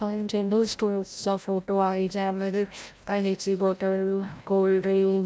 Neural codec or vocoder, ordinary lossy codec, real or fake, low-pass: codec, 16 kHz, 0.5 kbps, FreqCodec, larger model; none; fake; none